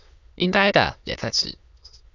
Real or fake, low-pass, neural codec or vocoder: fake; 7.2 kHz; autoencoder, 22.05 kHz, a latent of 192 numbers a frame, VITS, trained on many speakers